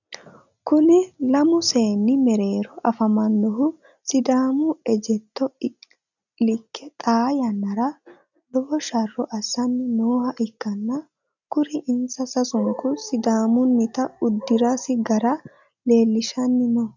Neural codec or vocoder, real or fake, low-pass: none; real; 7.2 kHz